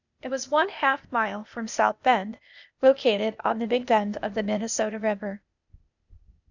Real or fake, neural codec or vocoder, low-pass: fake; codec, 16 kHz, 0.8 kbps, ZipCodec; 7.2 kHz